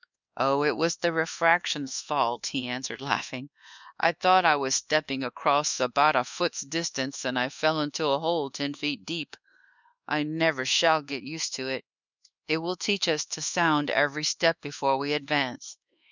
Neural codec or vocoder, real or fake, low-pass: codec, 24 kHz, 1.2 kbps, DualCodec; fake; 7.2 kHz